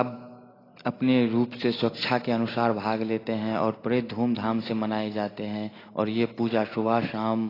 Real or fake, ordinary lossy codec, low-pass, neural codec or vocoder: real; AAC, 24 kbps; 5.4 kHz; none